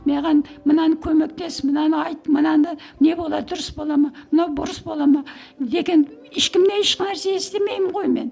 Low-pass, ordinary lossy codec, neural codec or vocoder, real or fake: none; none; none; real